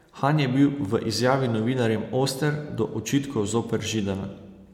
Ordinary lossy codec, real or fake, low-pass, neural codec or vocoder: MP3, 96 kbps; real; 19.8 kHz; none